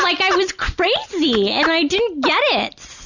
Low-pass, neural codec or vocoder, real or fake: 7.2 kHz; none; real